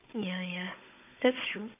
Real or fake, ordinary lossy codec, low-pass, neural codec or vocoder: fake; AAC, 24 kbps; 3.6 kHz; codec, 16 kHz, 16 kbps, FunCodec, trained on Chinese and English, 50 frames a second